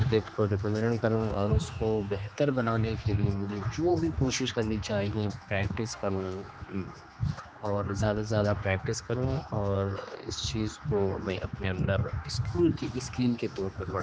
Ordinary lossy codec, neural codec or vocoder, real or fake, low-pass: none; codec, 16 kHz, 2 kbps, X-Codec, HuBERT features, trained on general audio; fake; none